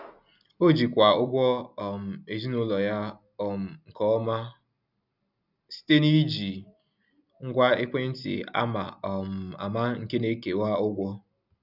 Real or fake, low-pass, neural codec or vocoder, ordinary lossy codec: real; 5.4 kHz; none; none